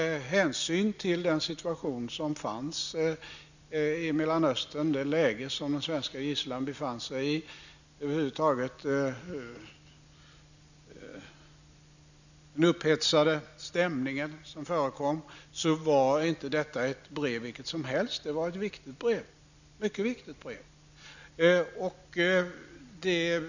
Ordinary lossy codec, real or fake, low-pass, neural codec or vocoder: none; real; 7.2 kHz; none